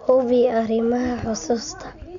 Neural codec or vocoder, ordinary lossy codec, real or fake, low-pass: none; none; real; 7.2 kHz